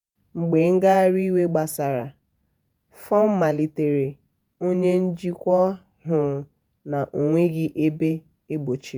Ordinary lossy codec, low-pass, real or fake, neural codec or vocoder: none; 19.8 kHz; fake; vocoder, 48 kHz, 128 mel bands, Vocos